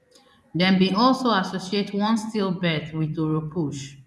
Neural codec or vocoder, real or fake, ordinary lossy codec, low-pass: vocoder, 24 kHz, 100 mel bands, Vocos; fake; none; none